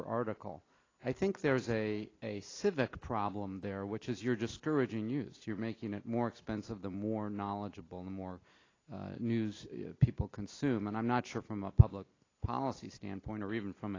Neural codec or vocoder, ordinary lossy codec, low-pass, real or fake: none; AAC, 32 kbps; 7.2 kHz; real